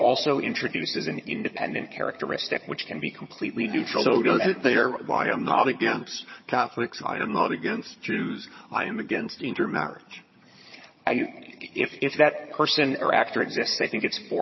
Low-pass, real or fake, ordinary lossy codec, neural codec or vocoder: 7.2 kHz; fake; MP3, 24 kbps; vocoder, 22.05 kHz, 80 mel bands, HiFi-GAN